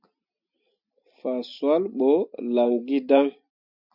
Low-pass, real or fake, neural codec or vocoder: 5.4 kHz; real; none